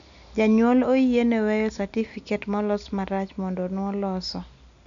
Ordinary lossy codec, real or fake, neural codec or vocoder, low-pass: none; real; none; 7.2 kHz